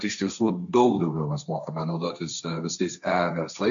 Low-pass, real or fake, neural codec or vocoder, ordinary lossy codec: 7.2 kHz; fake; codec, 16 kHz, 1.1 kbps, Voila-Tokenizer; MP3, 64 kbps